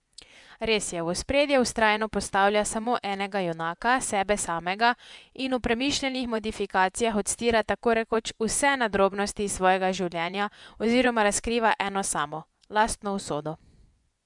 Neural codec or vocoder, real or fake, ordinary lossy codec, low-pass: vocoder, 24 kHz, 100 mel bands, Vocos; fake; MP3, 96 kbps; 10.8 kHz